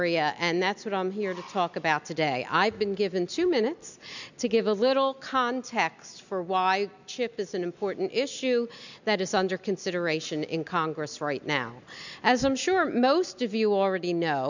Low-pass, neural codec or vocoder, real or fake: 7.2 kHz; none; real